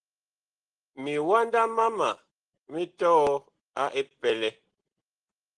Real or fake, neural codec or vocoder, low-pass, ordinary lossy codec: real; none; 9.9 kHz; Opus, 16 kbps